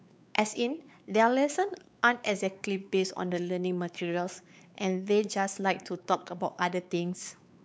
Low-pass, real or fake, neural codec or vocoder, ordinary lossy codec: none; fake; codec, 16 kHz, 4 kbps, X-Codec, WavLM features, trained on Multilingual LibriSpeech; none